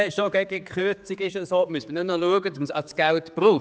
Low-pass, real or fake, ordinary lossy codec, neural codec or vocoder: none; fake; none; codec, 16 kHz, 4 kbps, X-Codec, HuBERT features, trained on general audio